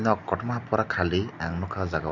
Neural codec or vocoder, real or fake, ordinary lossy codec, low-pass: none; real; none; 7.2 kHz